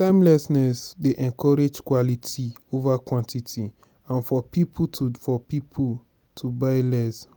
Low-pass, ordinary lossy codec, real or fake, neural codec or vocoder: none; none; real; none